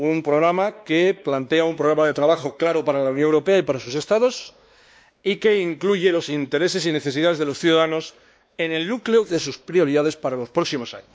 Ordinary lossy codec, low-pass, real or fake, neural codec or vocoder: none; none; fake; codec, 16 kHz, 2 kbps, X-Codec, WavLM features, trained on Multilingual LibriSpeech